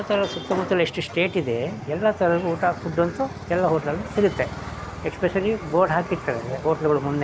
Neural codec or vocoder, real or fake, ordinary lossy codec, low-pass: none; real; none; none